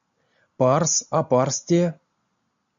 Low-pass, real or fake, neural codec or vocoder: 7.2 kHz; real; none